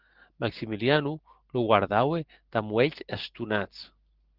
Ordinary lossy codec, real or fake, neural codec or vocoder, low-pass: Opus, 16 kbps; real; none; 5.4 kHz